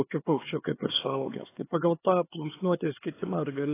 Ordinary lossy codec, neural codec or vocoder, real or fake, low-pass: AAC, 16 kbps; codec, 16 kHz, 4 kbps, X-Codec, HuBERT features, trained on LibriSpeech; fake; 3.6 kHz